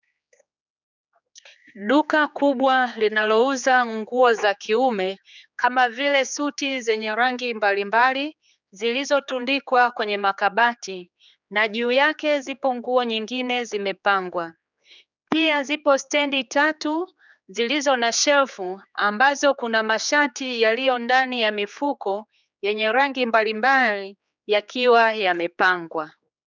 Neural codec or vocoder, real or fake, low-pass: codec, 16 kHz, 4 kbps, X-Codec, HuBERT features, trained on general audio; fake; 7.2 kHz